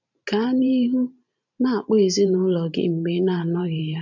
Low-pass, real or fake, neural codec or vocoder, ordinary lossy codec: 7.2 kHz; fake; vocoder, 44.1 kHz, 128 mel bands every 512 samples, BigVGAN v2; none